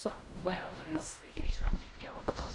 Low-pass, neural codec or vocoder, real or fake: 10.8 kHz; codec, 16 kHz in and 24 kHz out, 0.4 kbps, LongCat-Audio-Codec, fine tuned four codebook decoder; fake